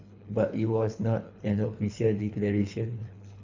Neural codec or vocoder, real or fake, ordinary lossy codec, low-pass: codec, 24 kHz, 3 kbps, HILCodec; fake; MP3, 64 kbps; 7.2 kHz